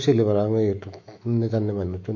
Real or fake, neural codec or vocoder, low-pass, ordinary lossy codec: fake; autoencoder, 48 kHz, 128 numbers a frame, DAC-VAE, trained on Japanese speech; 7.2 kHz; MP3, 48 kbps